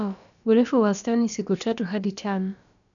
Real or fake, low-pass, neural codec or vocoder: fake; 7.2 kHz; codec, 16 kHz, about 1 kbps, DyCAST, with the encoder's durations